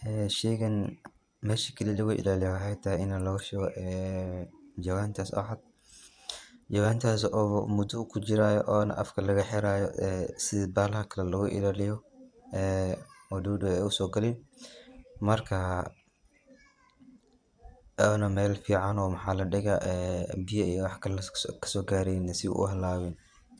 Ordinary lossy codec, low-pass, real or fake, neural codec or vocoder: none; 9.9 kHz; fake; vocoder, 24 kHz, 100 mel bands, Vocos